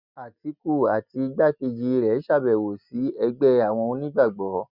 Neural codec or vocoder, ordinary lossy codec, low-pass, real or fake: none; none; 5.4 kHz; real